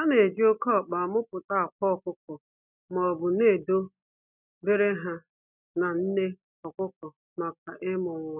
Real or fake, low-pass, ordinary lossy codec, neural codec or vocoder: real; 3.6 kHz; none; none